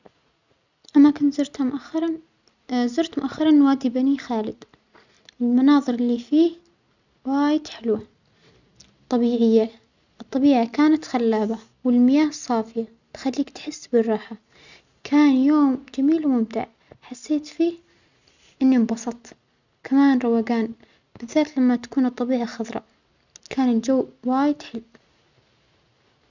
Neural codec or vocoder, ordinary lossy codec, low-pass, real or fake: none; none; 7.2 kHz; real